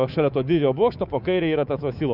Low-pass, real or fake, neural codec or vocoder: 5.4 kHz; fake; codec, 24 kHz, 3.1 kbps, DualCodec